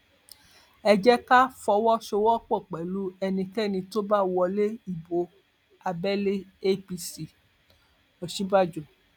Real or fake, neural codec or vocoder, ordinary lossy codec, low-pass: real; none; none; 19.8 kHz